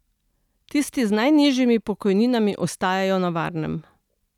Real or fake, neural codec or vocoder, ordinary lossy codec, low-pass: real; none; none; 19.8 kHz